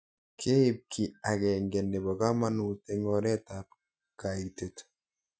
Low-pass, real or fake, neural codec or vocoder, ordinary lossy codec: none; real; none; none